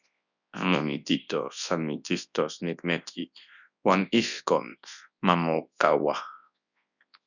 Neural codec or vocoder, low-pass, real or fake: codec, 24 kHz, 0.9 kbps, WavTokenizer, large speech release; 7.2 kHz; fake